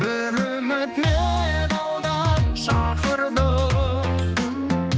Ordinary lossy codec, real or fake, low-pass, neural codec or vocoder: none; fake; none; codec, 16 kHz, 2 kbps, X-Codec, HuBERT features, trained on general audio